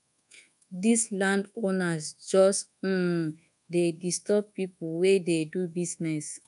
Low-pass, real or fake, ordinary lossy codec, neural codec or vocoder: 10.8 kHz; fake; none; codec, 24 kHz, 1.2 kbps, DualCodec